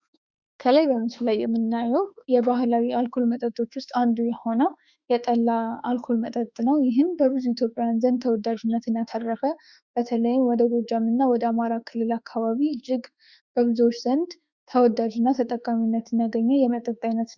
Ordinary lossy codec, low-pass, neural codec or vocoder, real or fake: Opus, 64 kbps; 7.2 kHz; autoencoder, 48 kHz, 32 numbers a frame, DAC-VAE, trained on Japanese speech; fake